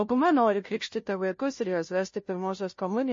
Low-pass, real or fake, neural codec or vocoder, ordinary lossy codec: 7.2 kHz; fake; codec, 16 kHz, 0.5 kbps, FunCodec, trained on Chinese and English, 25 frames a second; MP3, 32 kbps